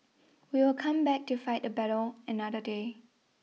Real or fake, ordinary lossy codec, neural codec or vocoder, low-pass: real; none; none; none